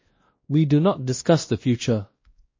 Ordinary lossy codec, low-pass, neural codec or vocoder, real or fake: MP3, 32 kbps; 7.2 kHz; codec, 16 kHz, 1 kbps, X-Codec, WavLM features, trained on Multilingual LibriSpeech; fake